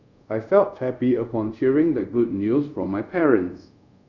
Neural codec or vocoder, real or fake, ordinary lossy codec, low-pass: codec, 24 kHz, 0.5 kbps, DualCodec; fake; none; 7.2 kHz